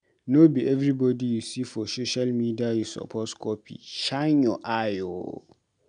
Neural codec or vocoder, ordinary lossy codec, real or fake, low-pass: none; none; real; 9.9 kHz